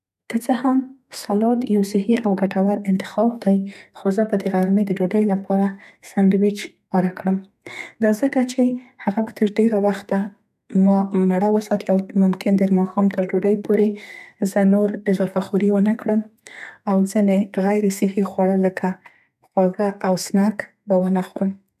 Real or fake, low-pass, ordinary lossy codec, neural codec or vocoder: fake; 14.4 kHz; none; codec, 32 kHz, 1.9 kbps, SNAC